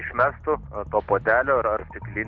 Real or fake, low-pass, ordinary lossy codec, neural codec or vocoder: real; 7.2 kHz; Opus, 32 kbps; none